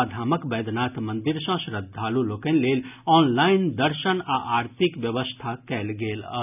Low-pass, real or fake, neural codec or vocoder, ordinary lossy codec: 3.6 kHz; real; none; none